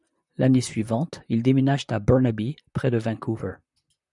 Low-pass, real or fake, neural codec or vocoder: 10.8 kHz; fake; vocoder, 44.1 kHz, 128 mel bands, Pupu-Vocoder